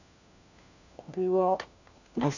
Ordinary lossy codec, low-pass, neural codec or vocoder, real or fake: none; 7.2 kHz; codec, 16 kHz, 1 kbps, FunCodec, trained on LibriTTS, 50 frames a second; fake